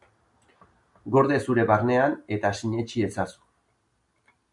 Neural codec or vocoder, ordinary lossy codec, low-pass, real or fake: none; MP3, 96 kbps; 10.8 kHz; real